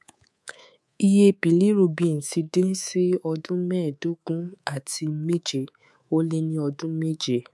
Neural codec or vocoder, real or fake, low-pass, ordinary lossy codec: codec, 24 kHz, 3.1 kbps, DualCodec; fake; none; none